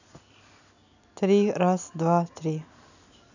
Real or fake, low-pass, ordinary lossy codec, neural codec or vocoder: real; 7.2 kHz; none; none